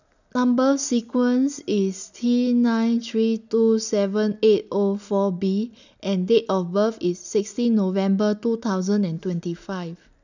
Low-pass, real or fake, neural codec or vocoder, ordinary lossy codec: 7.2 kHz; real; none; none